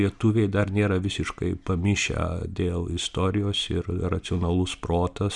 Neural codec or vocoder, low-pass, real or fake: none; 10.8 kHz; real